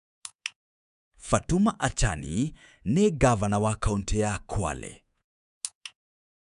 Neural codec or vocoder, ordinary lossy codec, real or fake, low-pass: codec, 24 kHz, 3.1 kbps, DualCodec; none; fake; 10.8 kHz